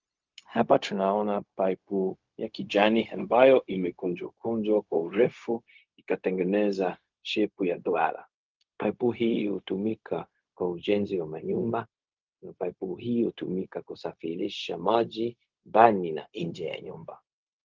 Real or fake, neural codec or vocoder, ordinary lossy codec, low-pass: fake; codec, 16 kHz, 0.4 kbps, LongCat-Audio-Codec; Opus, 24 kbps; 7.2 kHz